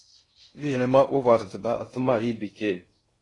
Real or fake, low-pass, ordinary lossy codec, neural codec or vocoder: fake; 10.8 kHz; AAC, 32 kbps; codec, 16 kHz in and 24 kHz out, 0.6 kbps, FocalCodec, streaming, 4096 codes